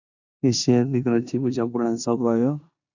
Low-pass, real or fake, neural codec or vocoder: 7.2 kHz; fake; codec, 16 kHz in and 24 kHz out, 0.9 kbps, LongCat-Audio-Codec, four codebook decoder